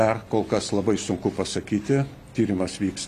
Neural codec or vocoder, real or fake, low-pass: none; real; 14.4 kHz